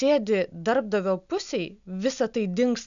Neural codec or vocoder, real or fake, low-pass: none; real; 7.2 kHz